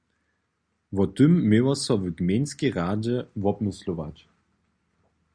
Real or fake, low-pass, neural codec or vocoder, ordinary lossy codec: real; 9.9 kHz; none; Opus, 64 kbps